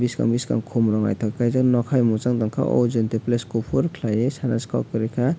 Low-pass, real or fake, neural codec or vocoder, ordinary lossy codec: none; real; none; none